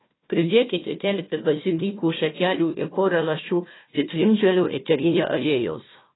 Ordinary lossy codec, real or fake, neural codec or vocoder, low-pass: AAC, 16 kbps; fake; codec, 16 kHz, 1 kbps, FunCodec, trained on Chinese and English, 50 frames a second; 7.2 kHz